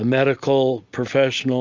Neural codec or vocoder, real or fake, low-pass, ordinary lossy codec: none; real; 7.2 kHz; Opus, 32 kbps